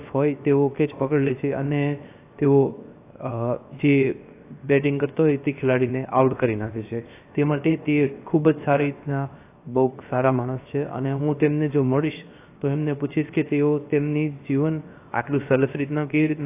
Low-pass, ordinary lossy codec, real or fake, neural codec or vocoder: 3.6 kHz; AAC, 24 kbps; fake; codec, 16 kHz, 0.7 kbps, FocalCodec